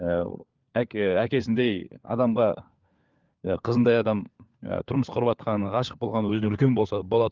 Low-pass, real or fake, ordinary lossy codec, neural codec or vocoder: 7.2 kHz; fake; Opus, 32 kbps; codec, 16 kHz, 4 kbps, FunCodec, trained on LibriTTS, 50 frames a second